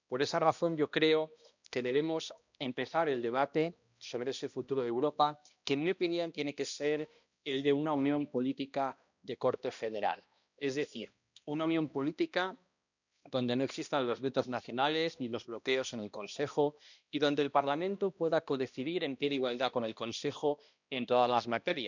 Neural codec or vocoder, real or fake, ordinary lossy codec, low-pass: codec, 16 kHz, 1 kbps, X-Codec, HuBERT features, trained on balanced general audio; fake; none; 7.2 kHz